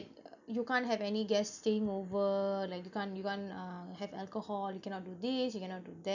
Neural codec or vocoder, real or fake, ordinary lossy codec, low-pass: none; real; none; 7.2 kHz